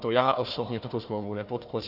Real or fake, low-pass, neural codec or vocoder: fake; 5.4 kHz; codec, 16 kHz, 1 kbps, FunCodec, trained on Chinese and English, 50 frames a second